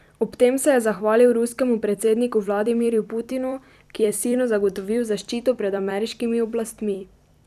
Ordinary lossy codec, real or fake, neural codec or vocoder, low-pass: none; fake; vocoder, 44.1 kHz, 128 mel bands every 256 samples, BigVGAN v2; 14.4 kHz